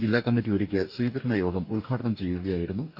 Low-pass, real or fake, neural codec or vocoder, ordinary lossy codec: 5.4 kHz; fake; codec, 44.1 kHz, 2.6 kbps, DAC; none